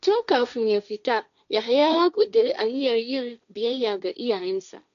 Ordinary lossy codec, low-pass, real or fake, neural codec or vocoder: none; 7.2 kHz; fake; codec, 16 kHz, 1.1 kbps, Voila-Tokenizer